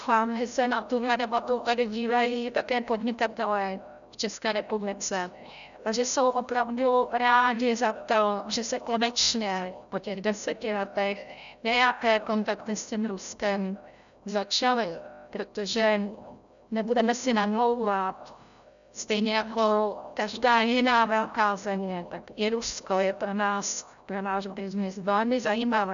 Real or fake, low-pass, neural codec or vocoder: fake; 7.2 kHz; codec, 16 kHz, 0.5 kbps, FreqCodec, larger model